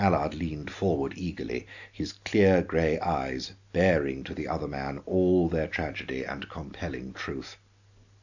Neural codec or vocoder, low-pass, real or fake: none; 7.2 kHz; real